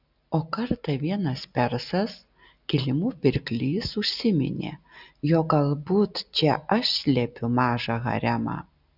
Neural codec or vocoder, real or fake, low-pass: none; real; 5.4 kHz